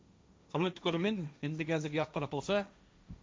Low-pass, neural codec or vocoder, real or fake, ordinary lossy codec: none; codec, 16 kHz, 1.1 kbps, Voila-Tokenizer; fake; none